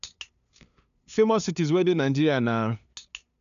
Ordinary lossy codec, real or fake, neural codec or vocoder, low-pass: none; fake; codec, 16 kHz, 2 kbps, FunCodec, trained on LibriTTS, 25 frames a second; 7.2 kHz